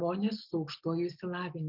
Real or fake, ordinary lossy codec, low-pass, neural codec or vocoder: real; Opus, 24 kbps; 5.4 kHz; none